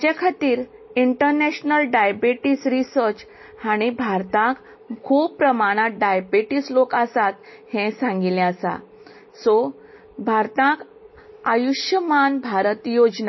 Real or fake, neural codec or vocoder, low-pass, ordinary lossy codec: real; none; 7.2 kHz; MP3, 24 kbps